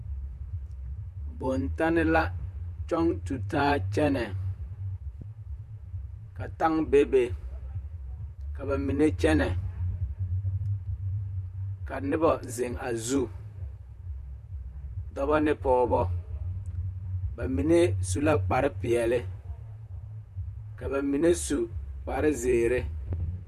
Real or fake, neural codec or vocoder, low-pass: fake; vocoder, 44.1 kHz, 128 mel bands, Pupu-Vocoder; 14.4 kHz